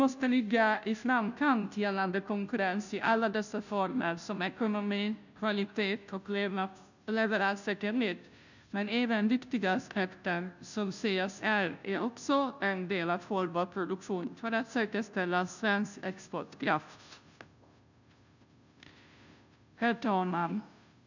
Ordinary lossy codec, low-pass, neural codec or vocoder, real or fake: none; 7.2 kHz; codec, 16 kHz, 0.5 kbps, FunCodec, trained on Chinese and English, 25 frames a second; fake